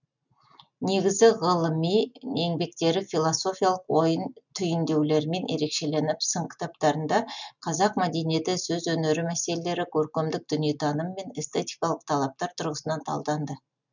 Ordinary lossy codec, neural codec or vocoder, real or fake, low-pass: none; none; real; 7.2 kHz